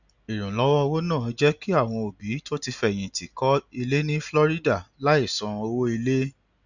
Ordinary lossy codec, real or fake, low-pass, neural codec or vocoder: none; real; 7.2 kHz; none